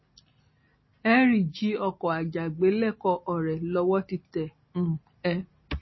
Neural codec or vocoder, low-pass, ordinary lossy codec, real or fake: none; 7.2 kHz; MP3, 24 kbps; real